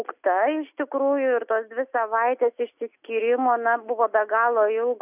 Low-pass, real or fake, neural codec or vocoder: 3.6 kHz; real; none